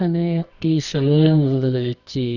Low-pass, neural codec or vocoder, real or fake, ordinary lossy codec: 7.2 kHz; codec, 24 kHz, 0.9 kbps, WavTokenizer, medium music audio release; fake; none